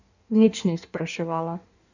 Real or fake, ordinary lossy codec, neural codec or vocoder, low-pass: fake; none; codec, 16 kHz in and 24 kHz out, 1.1 kbps, FireRedTTS-2 codec; 7.2 kHz